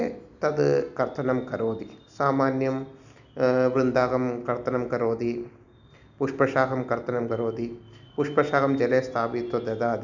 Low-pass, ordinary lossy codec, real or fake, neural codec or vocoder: 7.2 kHz; none; real; none